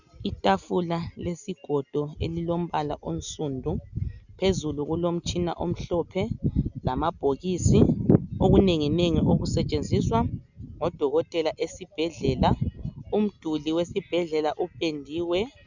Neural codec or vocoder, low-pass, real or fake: none; 7.2 kHz; real